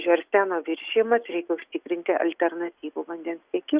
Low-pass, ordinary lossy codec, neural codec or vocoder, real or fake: 3.6 kHz; Opus, 64 kbps; none; real